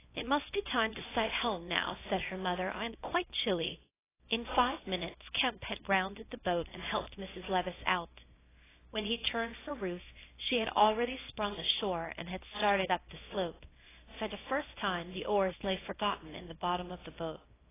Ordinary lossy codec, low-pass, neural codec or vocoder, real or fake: AAC, 16 kbps; 3.6 kHz; codec, 24 kHz, 0.9 kbps, WavTokenizer, small release; fake